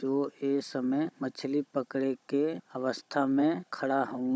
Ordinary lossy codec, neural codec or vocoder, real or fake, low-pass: none; codec, 16 kHz, 16 kbps, FunCodec, trained on Chinese and English, 50 frames a second; fake; none